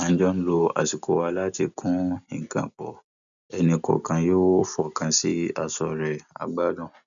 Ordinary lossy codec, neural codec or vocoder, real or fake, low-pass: none; none; real; 7.2 kHz